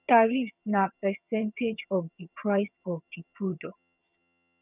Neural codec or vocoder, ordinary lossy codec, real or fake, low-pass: vocoder, 22.05 kHz, 80 mel bands, HiFi-GAN; none; fake; 3.6 kHz